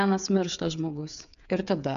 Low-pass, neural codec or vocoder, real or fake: 7.2 kHz; codec, 16 kHz, 8 kbps, FreqCodec, smaller model; fake